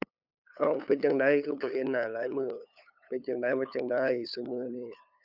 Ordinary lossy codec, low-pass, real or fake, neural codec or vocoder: none; 5.4 kHz; fake; codec, 16 kHz, 8 kbps, FunCodec, trained on LibriTTS, 25 frames a second